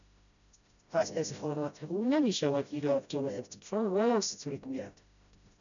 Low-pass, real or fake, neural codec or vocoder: 7.2 kHz; fake; codec, 16 kHz, 0.5 kbps, FreqCodec, smaller model